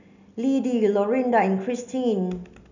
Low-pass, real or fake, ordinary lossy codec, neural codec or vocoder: 7.2 kHz; real; none; none